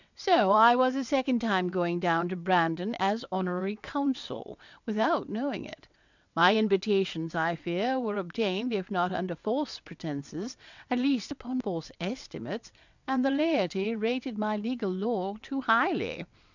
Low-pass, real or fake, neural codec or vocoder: 7.2 kHz; fake; vocoder, 22.05 kHz, 80 mel bands, WaveNeXt